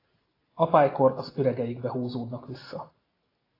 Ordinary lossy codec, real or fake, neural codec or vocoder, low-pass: AAC, 24 kbps; real; none; 5.4 kHz